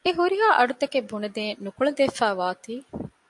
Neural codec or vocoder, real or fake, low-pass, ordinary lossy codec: none; real; 10.8 kHz; AAC, 64 kbps